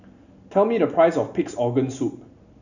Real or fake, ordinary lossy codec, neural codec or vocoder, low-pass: real; none; none; 7.2 kHz